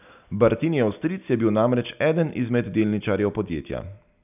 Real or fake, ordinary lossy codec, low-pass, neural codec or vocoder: real; none; 3.6 kHz; none